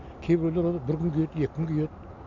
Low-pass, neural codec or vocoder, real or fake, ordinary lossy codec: 7.2 kHz; none; real; none